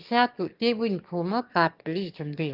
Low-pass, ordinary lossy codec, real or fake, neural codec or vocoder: 5.4 kHz; Opus, 32 kbps; fake; autoencoder, 22.05 kHz, a latent of 192 numbers a frame, VITS, trained on one speaker